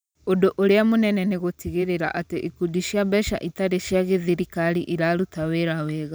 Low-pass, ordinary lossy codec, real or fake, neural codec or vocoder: none; none; fake; vocoder, 44.1 kHz, 128 mel bands every 512 samples, BigVGAN v2